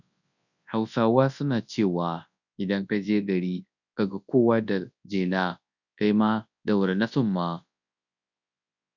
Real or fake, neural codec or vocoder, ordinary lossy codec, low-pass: fake; codec, 24 kHz, 0.9 kbps, WavTokenizer, large speech release; none; 7.2 kHz